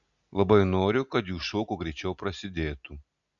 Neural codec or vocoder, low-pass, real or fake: none; 7.2 kHz; real